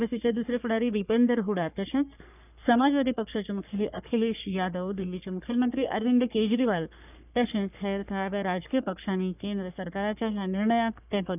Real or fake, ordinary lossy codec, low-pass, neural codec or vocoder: fake; none; 3.6 kHz; codec, 44.1 kHz, 3.4 kbps, Pupu-Codec